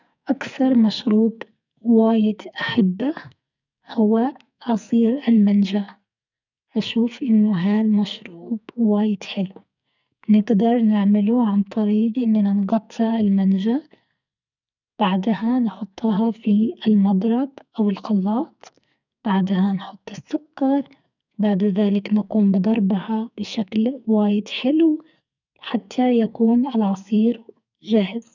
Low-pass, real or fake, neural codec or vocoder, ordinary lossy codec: 7.2 kHz; fake; codec, 44.1 kHz, 2.6 kbps, SNAC; none